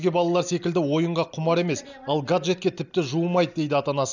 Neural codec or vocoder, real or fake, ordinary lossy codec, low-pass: none; real; none; 7.2 kHz